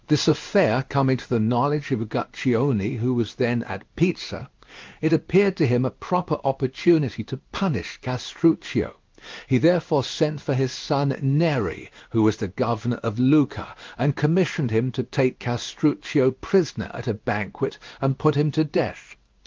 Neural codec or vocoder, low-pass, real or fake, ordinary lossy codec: codec, 16 kHz in and 24 kHz out, 1 kbps, XY-Tokenizer; 7.2 kHz; fake; Opus, 32 kbps